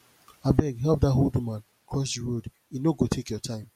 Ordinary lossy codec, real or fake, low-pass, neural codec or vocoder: MP3, 64 kbps; real; 19.8 kHz; none